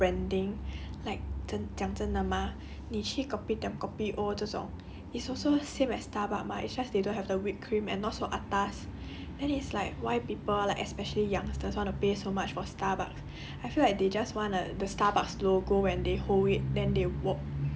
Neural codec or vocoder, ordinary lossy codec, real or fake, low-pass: none; none; real; none